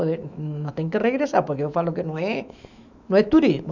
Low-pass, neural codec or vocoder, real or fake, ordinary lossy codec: 7.2 kHz; autoencoder, 48 kHz, 128 numbers a frame, DAC-VAE, trained on Japanese speech; fake; none